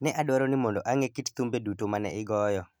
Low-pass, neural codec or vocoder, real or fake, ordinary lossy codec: none; none; real; none